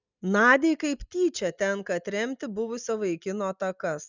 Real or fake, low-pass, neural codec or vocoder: real; 7.2 kHz; none